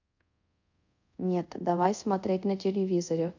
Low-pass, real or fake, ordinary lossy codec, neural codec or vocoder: 7.2 kHz; fake; none; codec, 24 kHz, 0.5 kbps, DualCodec